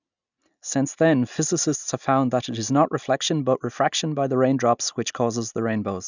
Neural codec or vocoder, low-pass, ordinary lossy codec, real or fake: none; 7.2 kHz; none; real